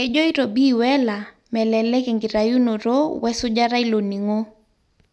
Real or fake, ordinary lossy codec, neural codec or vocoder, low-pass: real; none; none; none